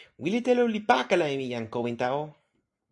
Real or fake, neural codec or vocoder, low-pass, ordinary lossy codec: real; none; 10.8 kHz; AAC, 64 kbps